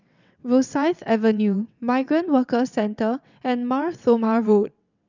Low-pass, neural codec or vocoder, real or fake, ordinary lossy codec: 7.2 kHz; vocoder, 22.05 kHz, 80 mel bands, WaveNeXt; fake; none